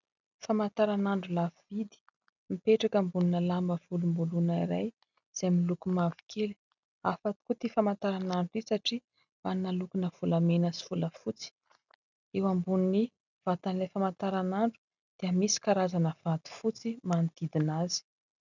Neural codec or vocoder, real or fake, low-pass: none; real; 7.2 kHz